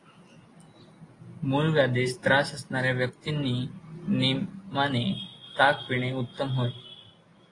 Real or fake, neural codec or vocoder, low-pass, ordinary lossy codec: real; none; 10.8 kHz; AAC, 32 kbps